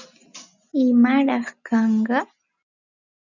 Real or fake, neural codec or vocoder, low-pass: real; none; 7.2 kHz